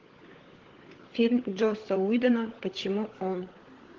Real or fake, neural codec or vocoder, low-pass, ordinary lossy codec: fake; codec, 16 kHz, 16 kbps, FunCodec, trained on LibriTTS, 50 frames a second; 7.2 kHz; Opus, 16 kbps